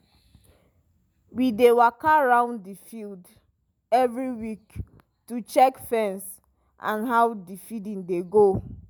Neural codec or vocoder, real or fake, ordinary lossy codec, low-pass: none; real; none; none